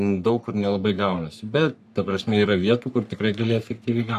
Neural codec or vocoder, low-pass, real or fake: codec, 44.1 kHz, 3.4 kbps, Pupu-Codec; 14.4 kHz; fake